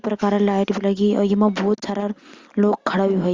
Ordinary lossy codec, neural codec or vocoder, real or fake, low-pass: Opus, 32 kbps; none; real; 7.2 kHz